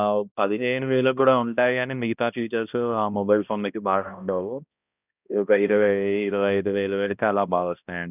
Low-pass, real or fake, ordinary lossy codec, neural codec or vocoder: 3.6 kHz; fake; none; codec, 16 kHz, 1 kbps, X-Codec, HuBERT features, trained on balanced general audio